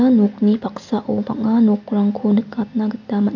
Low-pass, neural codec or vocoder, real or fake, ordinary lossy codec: 7.2 kHz; none; real; none